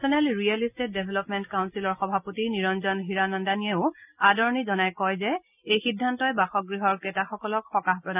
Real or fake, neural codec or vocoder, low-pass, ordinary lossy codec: real; none; 3.6 kHz; none